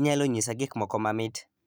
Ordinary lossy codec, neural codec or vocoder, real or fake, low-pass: none; vocoder, 44.1 kHz, 128 mel bands every 256 samples, BigVGAN v2; fake; none